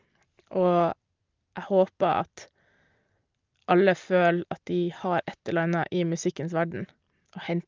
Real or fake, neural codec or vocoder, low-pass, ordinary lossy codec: real; none; 7.2 kHz; Opus, 32 kbps